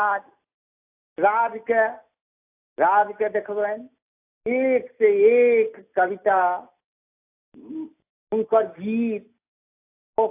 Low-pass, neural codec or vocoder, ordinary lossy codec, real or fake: 3.6 kHz; none; AAC, 24 kbps; real